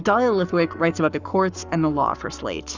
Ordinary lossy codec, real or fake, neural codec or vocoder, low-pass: Opus, 64 kbps; fake; codec, 44.1 kHz, 7.8 kbps, Pupu-Codec; 7.2 kHz